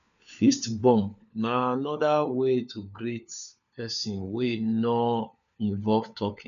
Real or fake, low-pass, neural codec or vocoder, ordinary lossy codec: fake; 7.2 kHz; codec, 16 kHz, 4 kbps, FunCodec, trained on LibriTTS, 50 frames a second; none